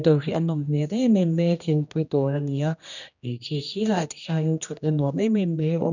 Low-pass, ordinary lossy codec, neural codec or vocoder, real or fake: 7.2 kHz; none; codec, 16 kHz, 1 kbps, X-Codec, HuBERT features, trained on general audio; fake